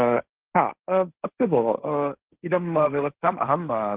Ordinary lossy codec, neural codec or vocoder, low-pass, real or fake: Opus, 16 kbps; codec, 16 kHz, 1.1 kbps, Voila-Tokenizer; 3.6 kHz; fake